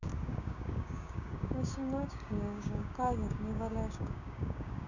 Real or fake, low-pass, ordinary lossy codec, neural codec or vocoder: fake; 7.2 kHz; none; codec, 16 kHz, 6 kbps, DAC